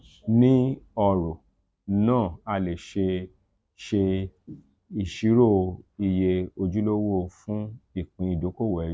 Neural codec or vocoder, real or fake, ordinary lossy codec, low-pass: none; real; none; none